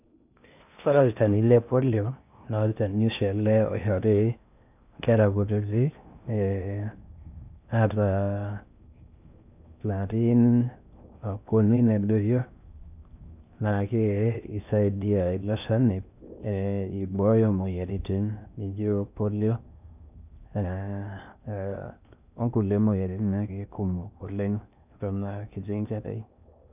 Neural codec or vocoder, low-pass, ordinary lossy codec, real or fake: codec, 16 kHz in and 24 kHz out, 0.6 kbps, FocalCodec, streaming, 4096 codes; 3.6 kHz; none; fake